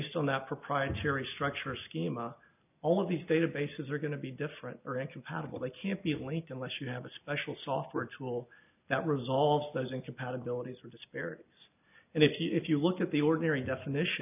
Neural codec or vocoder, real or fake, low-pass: none; real; 3.6 kHz